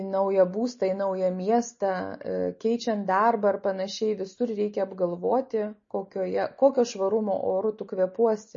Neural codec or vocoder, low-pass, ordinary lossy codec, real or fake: none; 7.2 kHz; MP3, 32 kbps; real